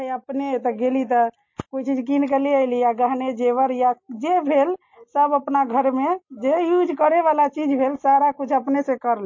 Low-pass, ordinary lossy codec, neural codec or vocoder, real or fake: 7.2 kHz; MP3, 32 kbps; none; real